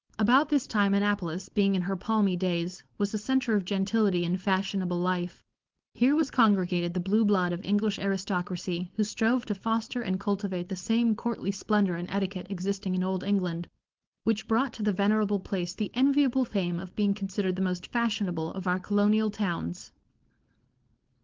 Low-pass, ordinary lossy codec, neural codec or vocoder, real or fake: 7.2 kHz; Opus, 16 kbps; codec, 16 kHz, 4.8 kbps, FACodec; fake